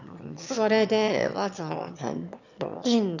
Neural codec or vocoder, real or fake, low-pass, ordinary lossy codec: autoencoder, 22.05 kHz, a latent of 192 numbers a frame, VITS, trained on one speaker; fake; 7.2 kHz; none